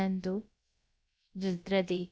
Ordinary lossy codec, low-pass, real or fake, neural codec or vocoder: none; none; fake; codec, 16 kHz, about 1 kbps, DyCAST, with the encoder's durations